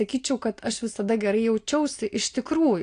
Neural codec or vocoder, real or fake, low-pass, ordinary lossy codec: none; real; 9.9 kHz; AAC, 48 kbps